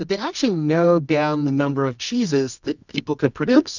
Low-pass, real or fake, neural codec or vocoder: 7.2 kHz; fake; codec, 24 kHz, 0.9 kbps, WavTokenizer, medium music audio release